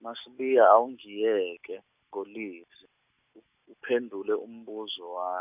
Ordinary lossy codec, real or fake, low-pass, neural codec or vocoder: none; real; 3.6 kHz; none